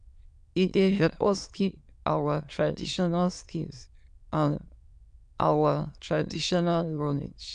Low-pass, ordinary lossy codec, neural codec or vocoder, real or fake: 9.9 kHz; AAC, 96 kbps; autoencoder, 22.05 kHz, a latent of 192 numbers a frame, VITS, trained on many speakers; fake